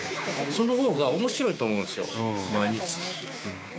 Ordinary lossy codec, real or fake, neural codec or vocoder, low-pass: none; fake; codec, 16 kHz, 6 kbps, DAC; none